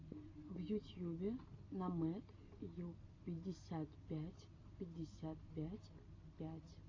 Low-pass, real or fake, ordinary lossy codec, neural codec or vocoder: 7.2 kHz; real; AAC, 32 kbps; none